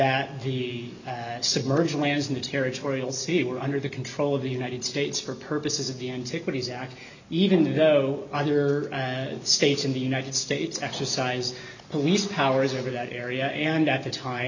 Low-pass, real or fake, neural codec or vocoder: 7.2 kHz; real; none